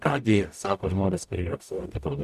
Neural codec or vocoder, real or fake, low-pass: codec, 44.1 kHz, 0.9 kbps, DAC; fake; 14.4 kHz